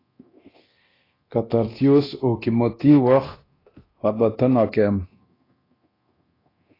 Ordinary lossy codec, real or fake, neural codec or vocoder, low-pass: AAC, 24 kbps; fake; codec, 24 kHz, 0.9 kbps, DualCodec; 5.4 kHz